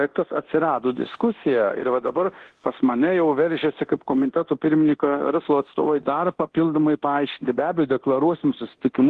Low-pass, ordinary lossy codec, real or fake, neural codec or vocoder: 10.8 kHz; Opus, 16 kbps; fake; codec, 24 kHz, 0.9 kbps, DualCodec